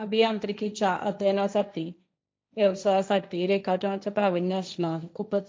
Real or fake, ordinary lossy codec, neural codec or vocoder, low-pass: fake; none; codec, 16 kHz, 1.1 kbps, Voila-Tokenizer; none